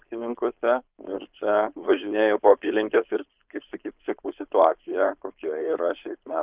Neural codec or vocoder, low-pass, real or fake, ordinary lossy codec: codec, 16 kHz, 4.8 kbps, FACodec; 3.6 kHz; fake; Opus, 32 kbps